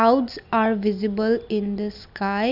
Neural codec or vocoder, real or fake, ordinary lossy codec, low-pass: none; real; none; 5.4 kHz